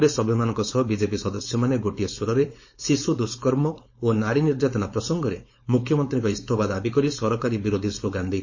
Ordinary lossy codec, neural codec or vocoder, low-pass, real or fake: MP3, 32 kbps; codec, 16 kHz, 4.8 kbps, FACodec; 7.2 kHz; fake